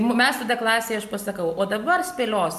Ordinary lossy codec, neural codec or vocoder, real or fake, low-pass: MP3, 96 kbps; none; real; 14.4 kHz